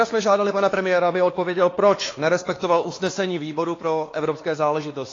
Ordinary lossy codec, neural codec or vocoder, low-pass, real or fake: AAC, 32 kbps; codec, 16 kHz, 2 kbps, X-Codec, WavLM features, trained on Multilingual LibriSpeech; 7.2 kHz; fake